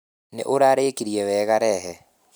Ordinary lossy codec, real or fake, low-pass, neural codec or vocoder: none; real; none; none